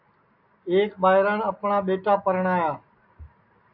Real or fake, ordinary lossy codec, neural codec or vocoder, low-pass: real; AAC, 48 kbps; none; 5.4 kHz